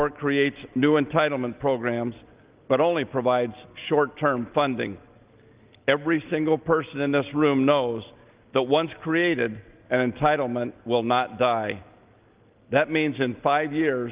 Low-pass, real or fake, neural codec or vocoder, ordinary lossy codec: 3.6 kHz; real; none; Opus, 32 kbps